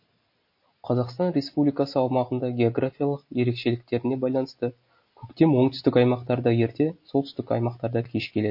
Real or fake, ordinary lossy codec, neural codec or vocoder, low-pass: real; MP3, 32 kbps; none; 5.4 kHz